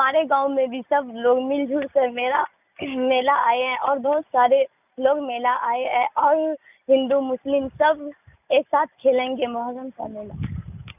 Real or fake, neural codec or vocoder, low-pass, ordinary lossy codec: real; none; 3.6 kHz; none